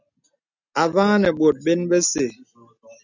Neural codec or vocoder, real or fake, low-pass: none; real; 7.2 kHz